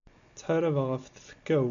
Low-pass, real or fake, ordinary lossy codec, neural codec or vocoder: 7.2 kHz; real; AAC, 64 kbps; none